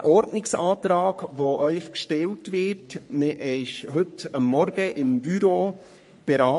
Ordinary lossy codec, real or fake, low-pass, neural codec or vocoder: MP3, 48 kbps; fake; 14.4 kHz; codec, 44.1 kHz, 3.4 kbps, Pupu-Codec